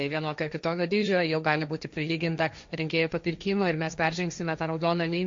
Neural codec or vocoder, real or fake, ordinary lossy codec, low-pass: codec, 16 kHz, 1.1 kbps, Voila-Tokenizer; fake; MP3, 48 kbps; 7.2 kHz